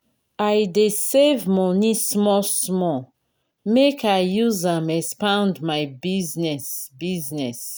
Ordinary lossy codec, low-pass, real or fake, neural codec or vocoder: none; none; real; none